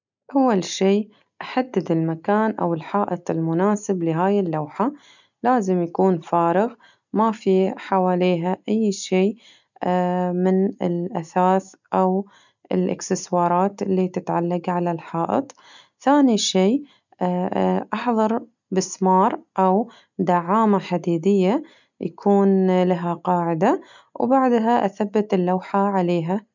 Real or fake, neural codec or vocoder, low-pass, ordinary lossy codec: real; none; 7.2 kHz; none